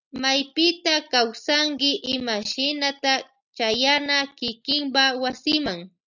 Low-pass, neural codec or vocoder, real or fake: 7.2 kHz; none; real